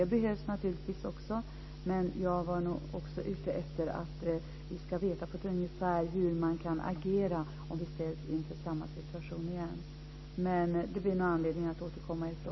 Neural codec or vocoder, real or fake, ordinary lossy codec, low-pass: none; real; MP3, 24 kbps; 7.2 kHz